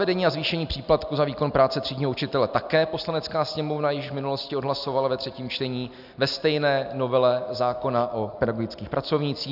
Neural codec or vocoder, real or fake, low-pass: none; real; 5.4 kHz